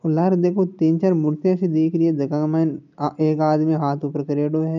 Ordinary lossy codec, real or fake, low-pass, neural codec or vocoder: none; real; 7.2 kHz; none